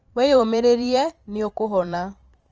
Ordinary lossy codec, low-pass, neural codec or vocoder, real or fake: Opus, 24 kbps; 7.2 kHz; vocoder, 44.1 kHz, 128 mel bands every 512 samples, BigVGAN v2; fake